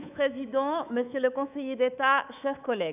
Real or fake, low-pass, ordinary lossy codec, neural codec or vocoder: fake; 3.6 kHz; none; codec, 24 kHz, 3.1 kbps, DualCodec